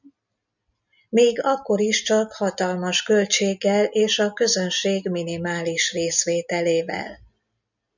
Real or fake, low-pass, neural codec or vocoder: real; 7.2 kHz; none